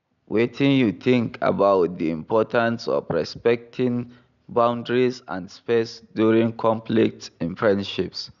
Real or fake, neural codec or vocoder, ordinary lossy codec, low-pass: real; none; none; 7.2 kHz